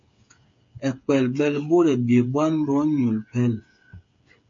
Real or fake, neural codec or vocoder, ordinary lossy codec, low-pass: fake; codec, 16 kHz, 8 kbps, FreqCodec, smaller model; MP3, 48 kbps; 7.2 kHz